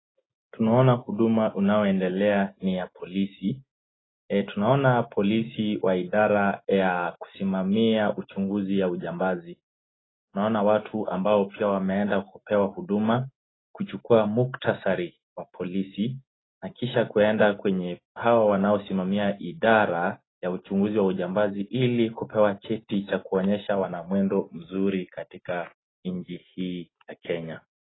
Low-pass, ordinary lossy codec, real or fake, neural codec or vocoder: 7.2 kHz; AAC, 16 kbps; real; none